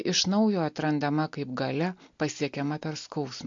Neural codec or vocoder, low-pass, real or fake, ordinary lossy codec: none; 7.2 kHz; real; MP3, 48 kbps